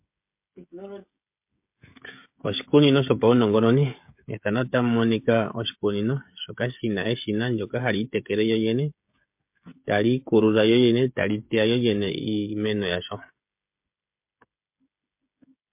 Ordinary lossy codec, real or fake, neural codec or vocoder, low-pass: MP3, 32 kbps; fake; codec, 16 kHz, 16 kbps, FreqCodec, smaller model; 3.6 kHz